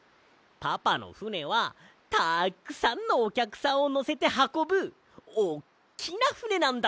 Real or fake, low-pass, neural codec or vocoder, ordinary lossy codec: real; none; none; none